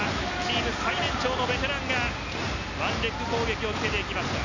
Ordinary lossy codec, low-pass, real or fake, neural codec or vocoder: none; 7.2 kHz; real; none